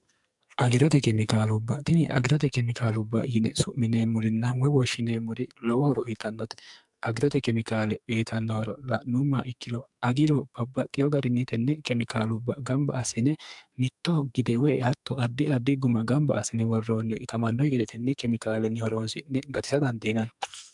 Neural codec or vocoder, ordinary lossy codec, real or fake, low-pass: codec, 44.1 kHz, 2.6 kbps, SNAC; MP3, 96 kbps; fake; 10.8 kHz